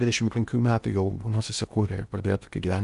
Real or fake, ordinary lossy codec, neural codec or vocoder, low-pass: fake; Opus, 64 kbps; codec, 16 kHz in and 24 kHz out, 0.8 kbps, FocalCodec, streaming, 65536 codes; 10.8 kHz